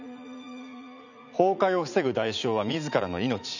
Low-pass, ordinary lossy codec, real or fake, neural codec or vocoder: 7.2 kHz; none; fake; vocoder, 44.1 kHz, 80 mel bands, Vocos